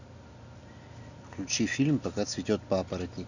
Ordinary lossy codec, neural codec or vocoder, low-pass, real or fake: none; none; 7.2 kHz; real